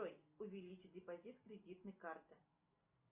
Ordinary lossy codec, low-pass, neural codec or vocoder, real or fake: Opus, 64 kbps; 3.6 kHz; none; real